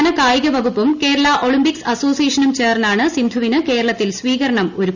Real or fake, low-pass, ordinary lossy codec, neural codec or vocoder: real; 7.2 kHz; none; none